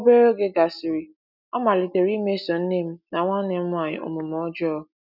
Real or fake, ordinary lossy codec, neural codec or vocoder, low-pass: real; none; none; 5.4 kHz